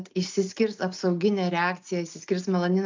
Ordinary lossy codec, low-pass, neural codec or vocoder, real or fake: MP3, 64 kbps; 7.2 kHz; none; real